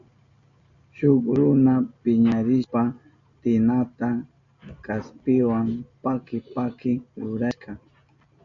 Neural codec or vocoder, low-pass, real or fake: none; 7.2 kHz; real